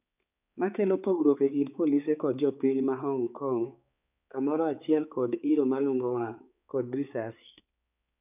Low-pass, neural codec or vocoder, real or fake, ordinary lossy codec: 3.6 kHz; codec, 16 kHz, 4 kbps, X-Codec, HuBERT features, trained on balanced general audio; fake; none